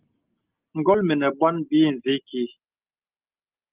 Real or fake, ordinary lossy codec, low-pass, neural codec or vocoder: real; Opus, 24 kbps; 3.6 kHz; none